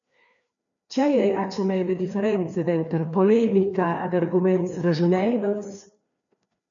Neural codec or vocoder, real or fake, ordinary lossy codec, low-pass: codec, 16 kHz, 2 kbps, FreqCodec, larger model; fake; MP3, 96 kbps; 7.2 kHz